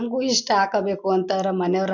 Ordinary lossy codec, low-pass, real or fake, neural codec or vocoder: none; 7.2 kHz; real; none